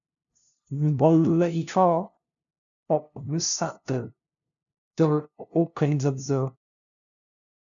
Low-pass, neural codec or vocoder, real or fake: 7.2 kHz; codec, 16 kHz, 0.5 kbps, FunCodec, trained on LibriTTS, 25 frames a second; fake